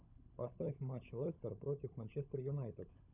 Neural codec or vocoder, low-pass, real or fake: codec, 16 kHz, 16 kbps, FunCodec, trained on LibriTTS, 50 frames a second; 3.6 kHz; fake